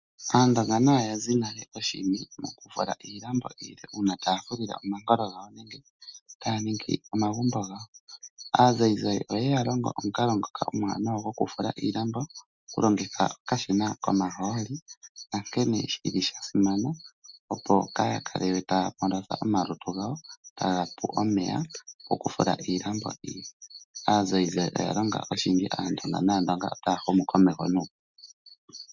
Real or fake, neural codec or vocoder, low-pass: real; none; 7.2 kHz